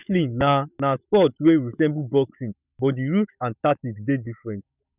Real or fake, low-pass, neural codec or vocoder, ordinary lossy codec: fake; 3.6 kHz; autoencoder, 48 kHz, 128 numbers a frame, DAC-VAE, trained on Japanese speech; none